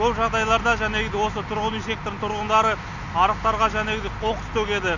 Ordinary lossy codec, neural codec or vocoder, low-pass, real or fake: none; none; 7.2 kHz; real